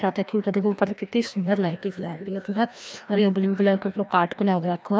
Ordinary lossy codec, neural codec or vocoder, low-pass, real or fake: none; codec, 16 kHz, 1 kbps, FreqCodec, larger model; none; fake